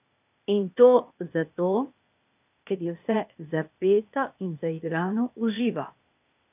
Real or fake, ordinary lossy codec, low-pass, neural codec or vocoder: fake; none; 3.6 kHz; codec, 16 kHz, 0.8 kbps, ZipCodec